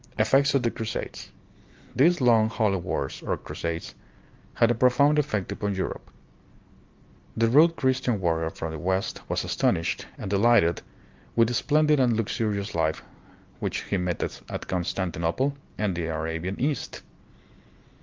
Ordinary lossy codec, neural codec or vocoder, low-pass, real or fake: Opus, 24 kbps; none; 7.2 kHz; real